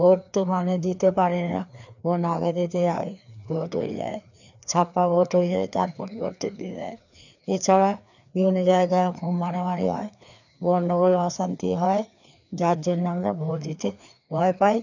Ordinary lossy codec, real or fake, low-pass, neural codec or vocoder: none; fake; 7.2 kHz; codec, 16 kHz, 2 kbps, FreqCodec, larger model